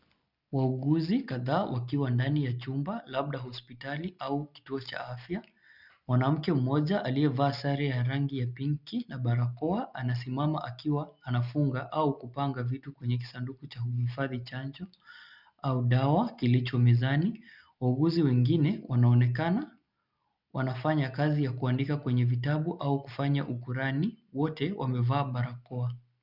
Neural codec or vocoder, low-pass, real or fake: none; 5.4 kHz; real